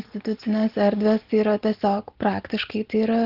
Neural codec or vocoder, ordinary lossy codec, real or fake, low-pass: none; Opus, 16 kbps; real; 5.4 kHz